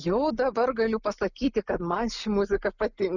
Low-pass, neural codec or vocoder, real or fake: 7.2 kHz; none; real